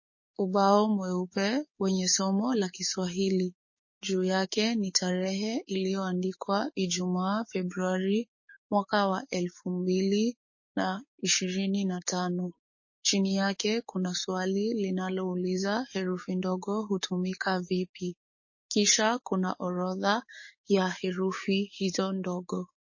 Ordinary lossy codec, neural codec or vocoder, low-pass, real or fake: MP3, 32 kbps; codec, 16 kHz, 6 kbps, DAC; 7.2 kHz; fake